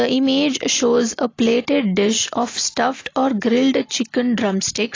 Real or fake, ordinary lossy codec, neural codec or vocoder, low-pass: real; AAC, 32 kbps; none; 7.2 kHz